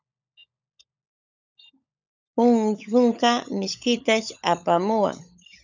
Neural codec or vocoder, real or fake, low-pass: codec, 16 kHz, 16 kbps, FunCodec, trained on LibriTTS, 50 frames a second; fake; 7.2 kHz